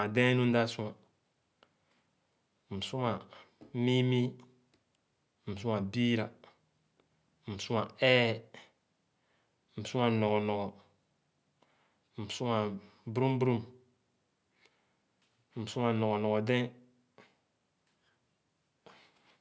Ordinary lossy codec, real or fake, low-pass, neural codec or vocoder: none; real; none; none